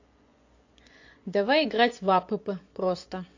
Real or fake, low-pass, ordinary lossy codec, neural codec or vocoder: fake; 7.2 kHz; AAC, 48 kbps; vocoder, 24 kHz, 100 mel bands, Vocos